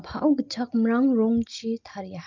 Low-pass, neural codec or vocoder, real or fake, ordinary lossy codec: 7.2 kHz; none; real; Opus, 24 kbps